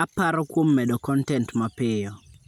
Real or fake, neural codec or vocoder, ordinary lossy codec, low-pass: real; none; none; 19.8 kHz